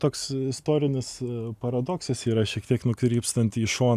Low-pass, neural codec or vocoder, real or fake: 14.4 kHz; none; real